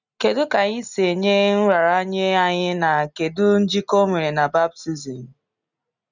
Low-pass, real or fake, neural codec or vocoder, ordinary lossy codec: 7.2 kHz; real; none; none